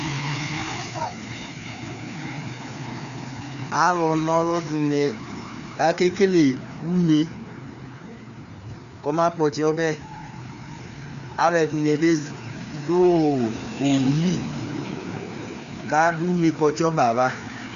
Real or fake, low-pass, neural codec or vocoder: fake; 7.2 kHz; codec, 16 kHz, 2 kbps, FreqCodec, larger model